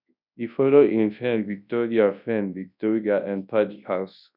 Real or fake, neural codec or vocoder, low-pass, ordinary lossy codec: fake; codec, 24 kHz, 0.9 kbps, WavTokenizer, large speech release; 5.4 kHz; none